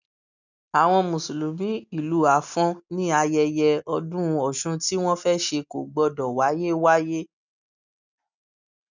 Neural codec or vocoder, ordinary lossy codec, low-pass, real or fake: none; none; 7.2 kHz; real